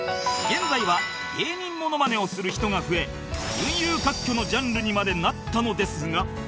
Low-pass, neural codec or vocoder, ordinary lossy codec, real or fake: none; none; none; real